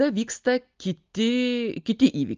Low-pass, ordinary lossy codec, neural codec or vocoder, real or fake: 7.2 kHz; Opus, 32 kbps; none; real